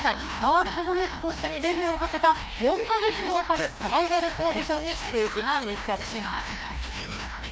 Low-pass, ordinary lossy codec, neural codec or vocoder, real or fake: none; none; codec, 16 kHz, 1 kbps, FreqCodec, larger model; fake